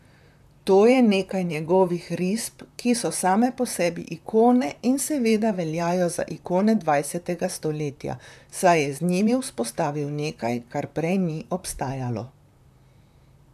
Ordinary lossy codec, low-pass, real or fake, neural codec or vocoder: none; 14.4 kHz; fake; vocoder, 44.1 kHz, 128 mel bands, Pupu-Vocoder